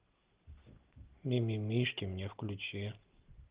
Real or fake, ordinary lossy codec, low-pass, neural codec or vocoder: real; Opus, 16 kbps; 3.6 kHz; none